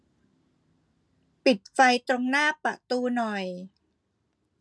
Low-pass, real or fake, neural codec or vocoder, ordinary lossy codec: none; real; none; none